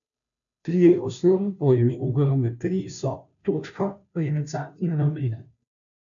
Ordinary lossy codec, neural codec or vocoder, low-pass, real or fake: none; codec, 16 kHz, 0.5 kbps, FunCodec, trained on Chinese and English, 25 frames a second; 7.2 kHz; fake